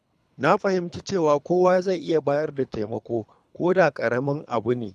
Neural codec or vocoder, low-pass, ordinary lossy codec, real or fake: codec, 24 kHz, 3 kbps, HILCodec; none; none; fake